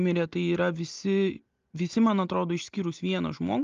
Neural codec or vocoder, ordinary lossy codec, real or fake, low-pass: none; Opus, 32 kbps; real; 7.2 kHz